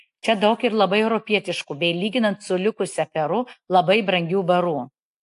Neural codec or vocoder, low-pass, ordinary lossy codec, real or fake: none; 10.8 kHz; AAC, 64 kbps; real